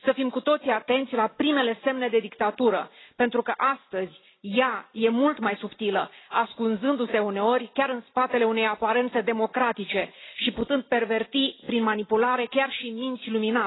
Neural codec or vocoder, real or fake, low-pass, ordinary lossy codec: none; real; 7.2 kHz; AAC, 16 kbps